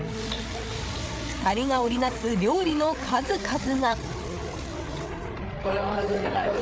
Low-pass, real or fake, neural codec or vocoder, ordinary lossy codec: none; fake; codec, 16 kHz, 8 kbps, FreqCodec, larger model; none